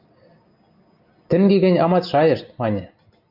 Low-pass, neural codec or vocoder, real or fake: 5.4 kHz; none; real